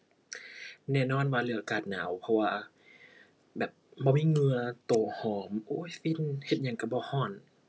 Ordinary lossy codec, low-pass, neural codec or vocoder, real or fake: none; none; none; real